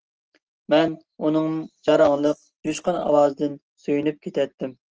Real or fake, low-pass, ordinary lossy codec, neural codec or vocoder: real; 7.2 kHz; Opus, 16 kbps; none